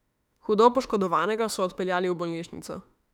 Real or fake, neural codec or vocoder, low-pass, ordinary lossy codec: fake; autoencoder, 48 kHz, 32 numbers a frame, DAC-VAE, trained on Japanese speech; 19.8 kHz; none